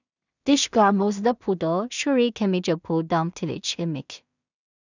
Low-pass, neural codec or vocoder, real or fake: 7.2 kHz; codec, 16 kHz in and 24 kHz out, 0.4 kbps, LongCat-Audio-Codec, two codebook decoder; fake